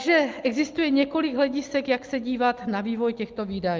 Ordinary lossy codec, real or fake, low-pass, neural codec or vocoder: Opus, 24 kbps; real; 7.2 kHz; none